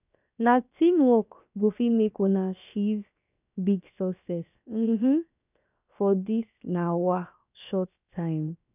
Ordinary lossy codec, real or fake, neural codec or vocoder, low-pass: none; fake; codec, 16 kHz, 0.7 kbps, FocalCodec; 3.6 kHz